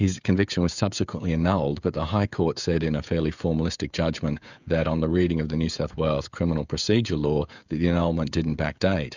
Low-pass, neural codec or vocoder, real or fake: 7.2 kHz; codec, 16 kHz, 16 kbps, FreqCodec, smaller model; fake